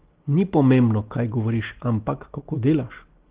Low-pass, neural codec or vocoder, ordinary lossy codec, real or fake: 3.6 kHz; none; Opus, 16 kbps; real